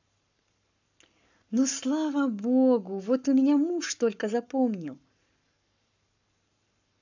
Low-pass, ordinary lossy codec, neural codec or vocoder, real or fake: 7.2 kHz; none; codec, 44.1 kHz, 7.8 kbps, Pupu-Codec; fake